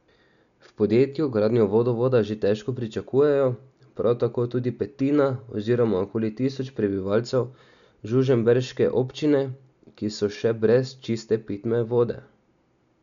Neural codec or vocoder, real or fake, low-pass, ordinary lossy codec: none; real; 7.2 kHz; MP3, 96 kbps